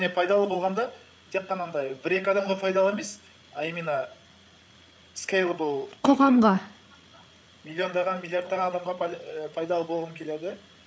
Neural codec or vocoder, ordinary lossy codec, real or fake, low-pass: codec, 16 kHz, 8 kbps, FreqCodec, larger model; none; fake; none